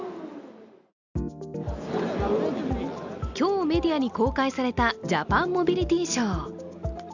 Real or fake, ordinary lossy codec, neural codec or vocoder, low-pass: real; none; none; 7.2 kHz